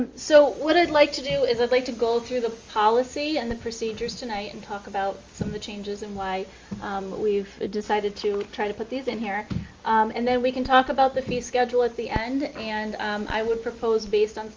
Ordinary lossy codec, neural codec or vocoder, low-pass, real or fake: Opus, 32 kbps; none; 7.2 kHz; real